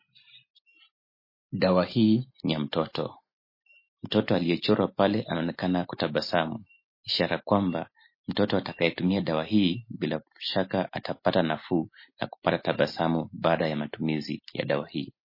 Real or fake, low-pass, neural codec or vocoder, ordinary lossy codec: real; 5.4 kHz; none; MP3, 24 kbps